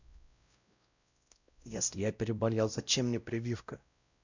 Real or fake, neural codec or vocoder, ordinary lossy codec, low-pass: fake; codec, 16 kHz, 0.5 kbps, X-Codec, WavLM features, trained on Multilingual LibriSpeech; none; 7.2 kHz